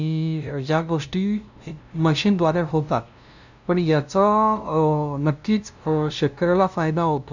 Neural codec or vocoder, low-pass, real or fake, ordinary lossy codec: codec, 16 kHz, 0.5 kbps, FunCodec, trained on LibriTTS, 25 frames a second; 7.2 kHz; fake; none